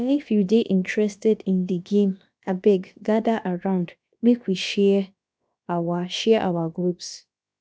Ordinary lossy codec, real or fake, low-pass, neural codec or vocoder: none; fake; none; codec, 16 kHz, about 1 kbps, DyCAST, with the encoder's durations